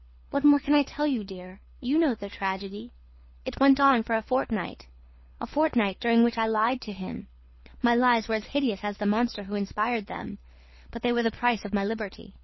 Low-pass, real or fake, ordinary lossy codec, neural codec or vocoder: 7.2 kHz; fake; MP3, 24 kbps; codec, 24 kHz, 6 kbps, HILCodec